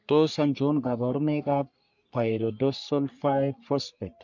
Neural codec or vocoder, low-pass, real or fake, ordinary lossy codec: codec, 44.1 kHz, 3.4 kbps, Pupu-Codec; 7.2 kHz; fake; MP3, 64 kbps